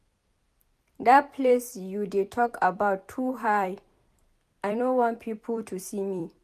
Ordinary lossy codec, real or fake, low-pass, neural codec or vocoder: none; fake; 14.4 kHz; vocoder, 44.1 kHz, 128 mel bands every 256 samples, BigVGAN v2